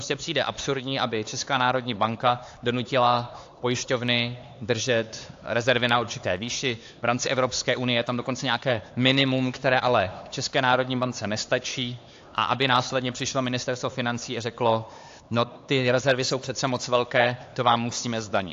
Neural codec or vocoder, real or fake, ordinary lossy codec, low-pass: codec, 16 kHz, 4 kbps, X-Codec, HuBERT features, trained on LibriSpeech; fake; AAC, 48 kbps; 7.2 kHz